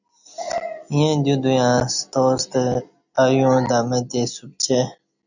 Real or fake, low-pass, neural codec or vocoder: real; 7.2 kHz; none